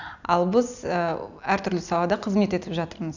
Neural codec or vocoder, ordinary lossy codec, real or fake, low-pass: none; none; real; 7.2 kHz